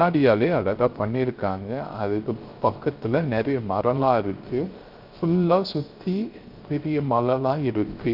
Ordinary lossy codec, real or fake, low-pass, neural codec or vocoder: Opus, 24 kbps; fake; 5.4 kHz; codec, 16 kHz, 0.3 kbps, FocalCodec